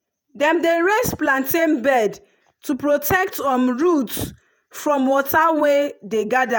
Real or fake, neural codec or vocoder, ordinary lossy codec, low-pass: fake; vocoder, 48 kHz, 128 mel bands, Vocos; none; none